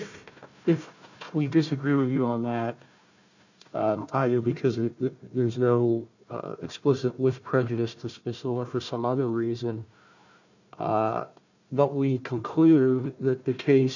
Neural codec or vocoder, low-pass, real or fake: codec, 16 kHz, 1 kbps, FunCodec, trained on Chinese and English, 50 frames a second; 7.2 kHz; fake